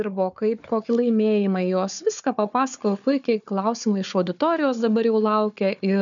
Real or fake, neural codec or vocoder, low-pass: fake; codec, 16 kHz, 4 kbps, FunCodec, trained on Chinese and English, 50 frames a second; 7.2 kHz